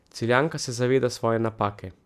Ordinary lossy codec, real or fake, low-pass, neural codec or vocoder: none; real; 14.4 kHz; none